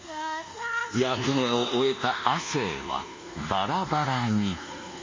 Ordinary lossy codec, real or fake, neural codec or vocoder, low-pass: MP3, 32 kbps; fake; codec, 24 kHz, 1.2 kbps, DualCodec; 7.2 kHz